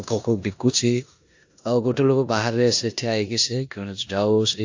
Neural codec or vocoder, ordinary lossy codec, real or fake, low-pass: codec, 16 kHz in and 24 kHz out, 0.9 kbps, LongCat-Audio-Codec, four codebook decoder; AAC, 48 kbps; fake; 7.2 kHz